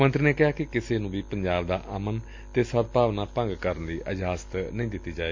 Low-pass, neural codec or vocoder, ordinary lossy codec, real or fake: 7.2 kHz; none; MP3, 48 kbps; real